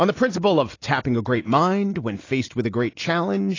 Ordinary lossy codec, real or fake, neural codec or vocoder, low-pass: AAC, 32 kbps; real; none; 7.2 kHz